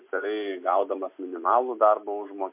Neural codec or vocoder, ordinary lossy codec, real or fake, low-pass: none; MP3, 32 kbps; real; 3.6 kHz